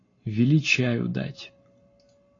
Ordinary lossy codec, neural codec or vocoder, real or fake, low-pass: AAC, 32 kbps; none; real; 7.2 kHz